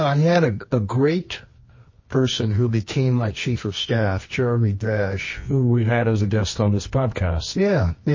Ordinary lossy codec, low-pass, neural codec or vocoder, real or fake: MP3, 32 kbps; 7.2 kHz; codec, 24 kHz, 0.9 kbps, WavTokenizer, medium music audio release; fake